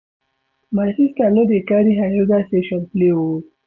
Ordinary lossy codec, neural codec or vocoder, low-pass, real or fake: none; none; 7.2 kHz; real